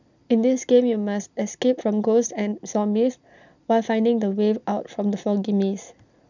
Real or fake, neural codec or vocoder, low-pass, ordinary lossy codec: real; none; 7.2 kHz; none